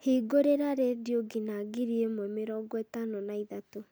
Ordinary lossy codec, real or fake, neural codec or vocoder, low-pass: none; real; none; none